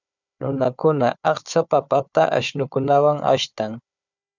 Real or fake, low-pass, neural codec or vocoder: fake; 7.2 kHz; codec, 16 kHz, 4 kbps, FunCodec, trained on Chinese and English, 50 frames a second